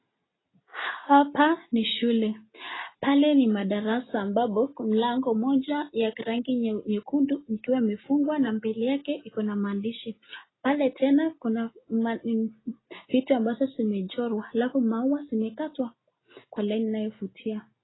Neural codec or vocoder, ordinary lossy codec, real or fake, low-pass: none; AAC, 16 kbps; real; 7.2 kHz